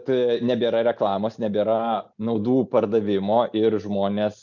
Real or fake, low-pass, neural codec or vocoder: real; 7.2 kHz; none